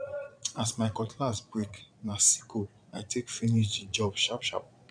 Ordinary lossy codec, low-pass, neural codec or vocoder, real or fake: none; 9.9 kHz; vocoder, 22.05 kHz, 80 mel bands, Vocos; fake